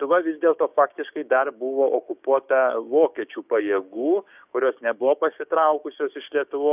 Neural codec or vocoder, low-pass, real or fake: codec, 16 kHz, 6 kbps, DAC; 3.6 kHz; fake